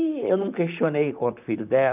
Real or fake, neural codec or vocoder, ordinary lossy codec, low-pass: fake; vocoder, 44.1 kHz, 128 mel bands, Pupu-Vocoder; none; 3.6 kHz